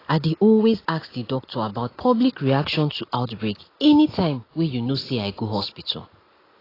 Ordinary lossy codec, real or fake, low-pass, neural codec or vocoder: AAC, 24 kbps; real; 5.4 kHz; none